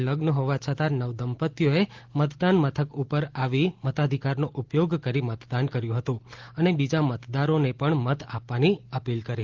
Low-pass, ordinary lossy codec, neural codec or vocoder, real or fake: 7.2 kHz; Opus, 16 kbps; none; real